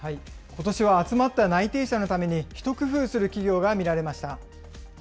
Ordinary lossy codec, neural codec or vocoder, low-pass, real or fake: none; none; none; real